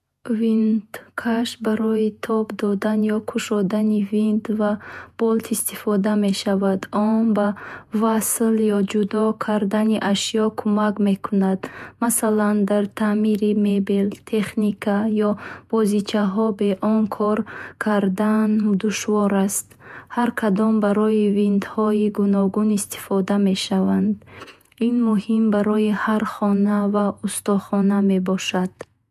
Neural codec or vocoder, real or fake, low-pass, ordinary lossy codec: vocoder, 48 kHz, 128 mel bands, Vocos; fake; 14.4 kHz; MP3, 96 kbps